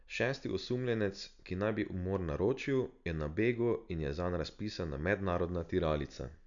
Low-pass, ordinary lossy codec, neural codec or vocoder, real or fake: 7.2 kHz; none; none; real